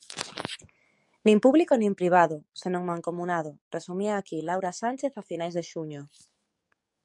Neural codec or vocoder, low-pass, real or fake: codec, 44.1 kHz, 7.8 kbps, DAC; 10.8 kHz; fake